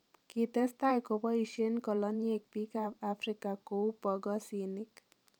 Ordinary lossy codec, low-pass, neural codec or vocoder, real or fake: none; 19.8 kHz; vocoder, 44.1 kHz, 128 mel bands every 512 samples, BigVGAN v2; fake